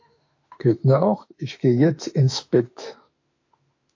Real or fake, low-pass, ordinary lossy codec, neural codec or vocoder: fake; 7.2 kHz; AAC, 48 kbps; autoencoder, 48 kHz, 32 numbers a frame, DAC-VAE, trained on Japanese speech